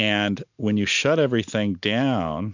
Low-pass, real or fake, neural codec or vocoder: 7.2 kHz; real; none